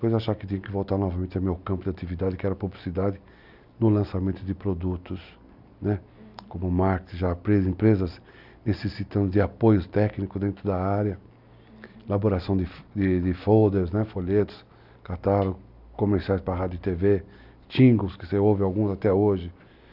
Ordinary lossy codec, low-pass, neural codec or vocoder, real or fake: none; 5.4 kHz; none; real